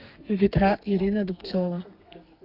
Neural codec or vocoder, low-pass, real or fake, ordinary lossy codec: codec, 32 kHz, 1.9 kbps, SNAC; 5.4 kHz; fake; Opus, 64 kbps